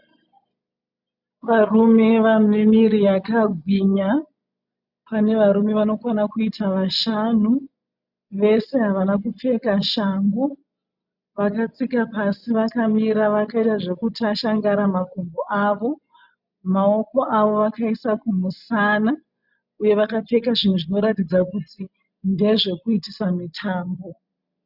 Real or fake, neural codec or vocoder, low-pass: real; none; 5.4 kHz